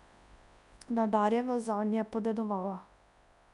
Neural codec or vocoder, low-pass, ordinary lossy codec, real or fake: codec, 24 kHz, 0.9 kbps, WavTokenizer, large speech release; 10.8 kHz; none; fake